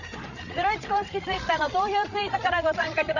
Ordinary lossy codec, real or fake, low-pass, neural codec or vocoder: none; fake; 7.2 kHz; codec, 16 kHz, 8 kbps, FreqCodec, larger model